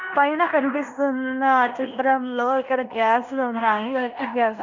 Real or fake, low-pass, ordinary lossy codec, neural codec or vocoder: fake; 7.2 kHz; none; codec, 16 kHz in and 24 kHz out, 0.9 kbps, LongCat-Audio-Codec, fine tuned four codebook decoder